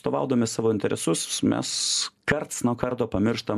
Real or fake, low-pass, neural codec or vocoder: real; 14.4 kHz; none